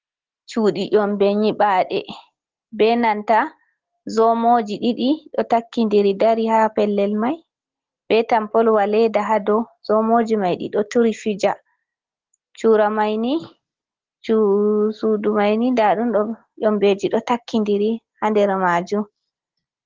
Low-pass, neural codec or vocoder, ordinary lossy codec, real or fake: 7.2 kHz; none; Opus, 16 kbps; real